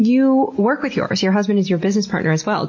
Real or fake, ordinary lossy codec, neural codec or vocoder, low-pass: real; MP3, 32 kbps; none; 7.2 kHz